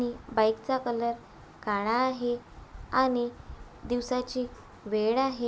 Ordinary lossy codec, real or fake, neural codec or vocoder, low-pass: none; real; none; none